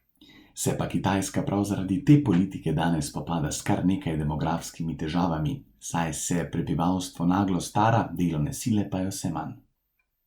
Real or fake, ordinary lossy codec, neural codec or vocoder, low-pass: real; Opus, 64 kbps; none; 19.8 kHz